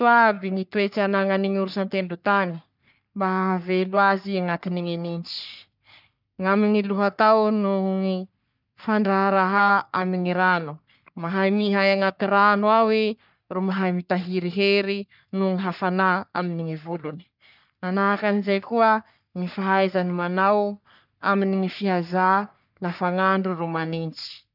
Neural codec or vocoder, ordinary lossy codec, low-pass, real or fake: codec, 44.1 kHz, 3.4 kbps, Pupu-Codec; none; 5.4 kHz; fake